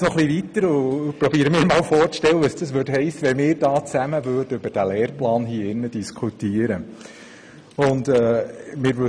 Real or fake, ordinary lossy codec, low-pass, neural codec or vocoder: real; none; 9.9 kHz; none